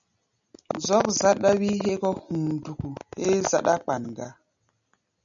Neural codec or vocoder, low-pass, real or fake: none; 7.2 kHz; real